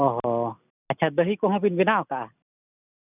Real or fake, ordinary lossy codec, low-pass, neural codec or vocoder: real; none; 3.6 kHz; none